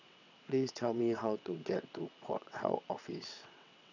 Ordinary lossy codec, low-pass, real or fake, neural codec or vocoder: none; 7.2 kHz; fake; vocoder, 22.05 kHz, 80 mel bands, WaveNeXt